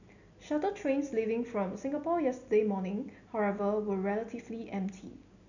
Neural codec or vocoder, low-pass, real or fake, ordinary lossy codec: none; 7.2 kHz; real; none